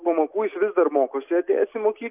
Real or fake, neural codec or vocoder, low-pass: real; none; 3.6 kHz